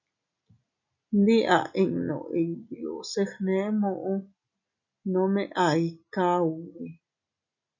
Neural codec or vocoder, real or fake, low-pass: none; real; 7.2 kHz